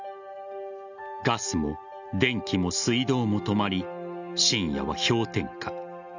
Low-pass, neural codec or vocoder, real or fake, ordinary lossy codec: 7.2 kHz; none; real; none